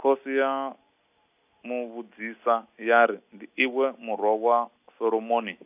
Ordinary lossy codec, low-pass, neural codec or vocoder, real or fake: AAC, 32 kbps; 3.6 kHz; none; real